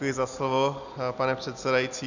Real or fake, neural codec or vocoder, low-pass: real; none; 7.2 kHz